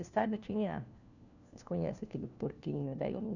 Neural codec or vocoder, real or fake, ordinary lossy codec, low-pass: codec, 16 kHz, 1 kbps, FunCodec, trained on LibriTTS, 50 frames a second; fake; none; 7.2 kHz